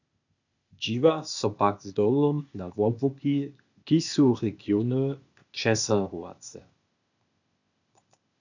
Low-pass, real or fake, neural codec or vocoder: 7.2 kHz; fake; codec, 16 kHz, 0.8 kbps, ZipCodec